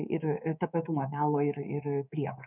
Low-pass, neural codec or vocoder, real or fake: 3.6 kHz; codec, 24 kHz, 3.1 kbps, DualCodec; fake